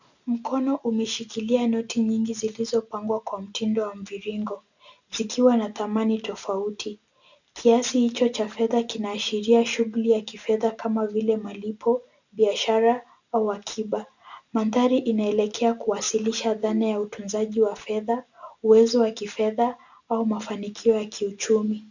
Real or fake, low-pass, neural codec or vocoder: real; 7.2 kHz; none